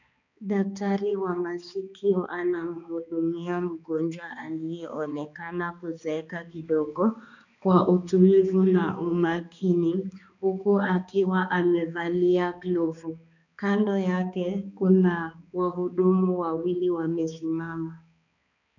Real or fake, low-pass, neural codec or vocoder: fake; 7.2 kHz; codec, 16 kHz, 2 kbps, X-Codec, HuBERT features, trained on balanced general audio